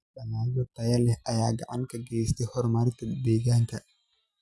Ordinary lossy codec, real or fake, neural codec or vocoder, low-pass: none; real; none; none